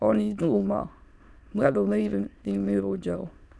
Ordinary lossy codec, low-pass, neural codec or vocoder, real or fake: none; none; autoencoder, 22.05 kHz, a latent of 192 numbers a frame, VITS, trained on many speakers; fake